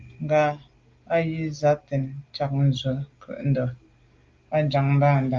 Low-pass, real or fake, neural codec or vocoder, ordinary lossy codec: 7.2 kHz; real; none; Opus, 32 kbps